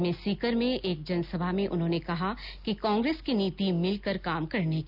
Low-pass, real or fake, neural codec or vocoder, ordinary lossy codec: 5.4 kHz; real; none; none